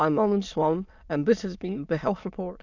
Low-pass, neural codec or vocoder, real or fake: 7.2 kHz; autoencoder, 22.05 kHz, a latent of 192 numbers a frame, VITS, trained on many speakers; fake